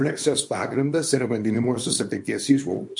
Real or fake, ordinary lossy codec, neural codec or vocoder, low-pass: fake; MP3, 48 kbps; codec, 24 kHz, 0.9 kbps, WavTokenizer, small release; 10.8 kHz